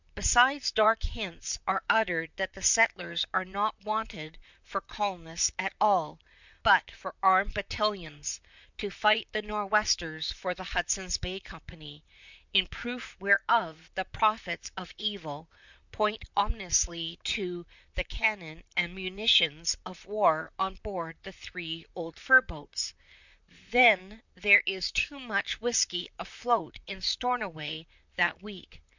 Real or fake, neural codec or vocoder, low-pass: fake; vocoder, 44.1 kHz, 128 mel bands, Pupu-Vocoder; 7.2 kHz